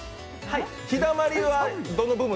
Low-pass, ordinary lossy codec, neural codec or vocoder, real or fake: none; none; none; real